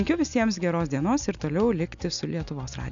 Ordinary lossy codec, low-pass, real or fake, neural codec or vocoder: MP3, 64 kbps; 7.2 kHz; real; none